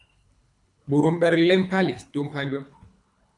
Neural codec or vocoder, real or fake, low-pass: codec, 24 kHz, 3 kbps, HILCodec; fake; 10.8 kHz